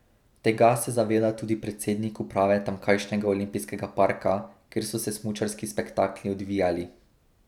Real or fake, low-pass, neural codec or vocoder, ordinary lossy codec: real; 19.8 kHz; none; none